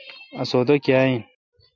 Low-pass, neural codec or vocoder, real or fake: 7.2 kHz; none; real